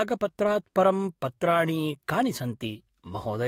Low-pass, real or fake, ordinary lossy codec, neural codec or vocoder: 19.8 kHz; fake; AAC, 48 kbps; codec, 44.1 kHz, 7.8 kbps, DAC